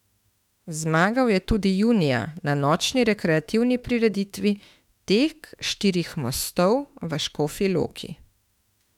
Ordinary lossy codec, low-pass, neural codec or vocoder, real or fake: none; 19.8 kHz; autoencoder, 48 kHz, 32 numbers a frame, DAC-VAE, trained on Japanese speech; fake